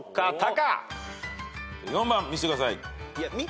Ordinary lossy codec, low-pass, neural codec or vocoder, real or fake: none; none; none; real